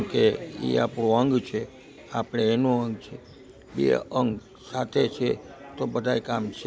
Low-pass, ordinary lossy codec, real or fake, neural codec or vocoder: none; none; real; none